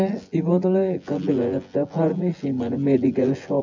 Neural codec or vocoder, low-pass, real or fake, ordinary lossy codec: vocoder, 24 kHz, 100 mel bands, Vocos; 7.2 kHz; fake; MP3, 64 kbps